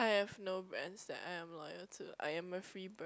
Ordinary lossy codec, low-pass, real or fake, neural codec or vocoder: none; none; real; none